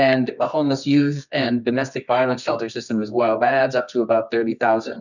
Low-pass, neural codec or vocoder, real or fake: 7.2 kHz; codec, 24 kHz, 0.9 kbps, WavTokenizer, medium music audio release; fake